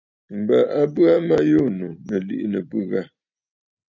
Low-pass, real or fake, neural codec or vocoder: 7.2 kHz; real; none